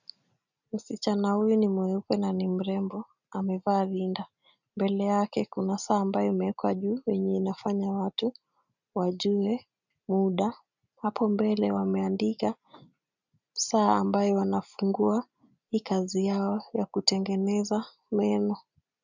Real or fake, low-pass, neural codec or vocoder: real; 7.2 kHz; none